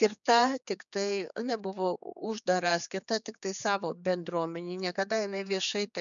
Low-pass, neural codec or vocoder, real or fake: 7.2 kHz; codec, 16 kHz, 4 kbps, X-Codec, HuBERT features, trained on general audio; fake